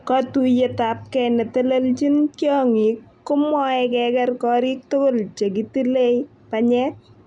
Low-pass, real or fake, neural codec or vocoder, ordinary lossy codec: 10.8 kHz; real; none; none